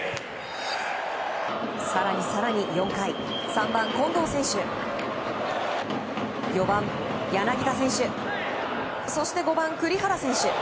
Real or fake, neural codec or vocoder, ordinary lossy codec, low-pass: real; none; none; none